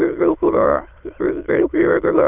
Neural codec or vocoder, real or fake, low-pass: autoencoder, 22.05 kHz, a latent of 192 numbers a frame, VITS, trained on many speakers; fake; 3.6 kHz